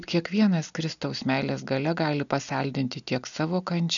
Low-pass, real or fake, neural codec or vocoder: 7.2 kHz; real; none